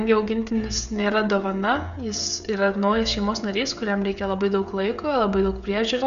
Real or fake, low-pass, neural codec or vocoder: fake; 7.2 kHz; codec, 16 kHz, 16 kbps, FreqCodec, smaller model